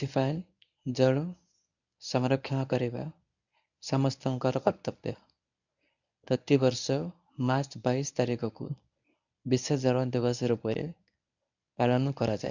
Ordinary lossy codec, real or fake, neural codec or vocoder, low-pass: none; fake; codec, 24 kHz, 0.9 kbps, WavTokenizer, medium speech release version 1; 7.2 kHz